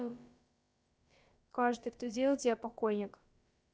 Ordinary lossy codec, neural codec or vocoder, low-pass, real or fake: none; codec, 16 kHz, about 1 kbps, DyCAST, with the encoder's durations; none; fake